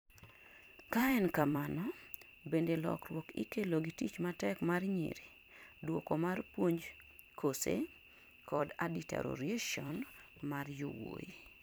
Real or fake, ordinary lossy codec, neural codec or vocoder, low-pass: fake; none; vocoder, 44.1 kHz, 128 mel bands every 256 samples, BigVGAN v2; none